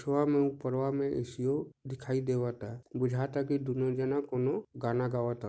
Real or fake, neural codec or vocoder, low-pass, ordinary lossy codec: real; none; none; none